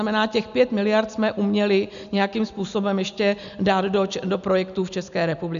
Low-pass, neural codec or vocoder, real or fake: 7.2 kHz; none; real